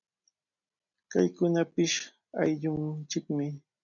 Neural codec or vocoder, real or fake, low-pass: none; real; 7.2 kHz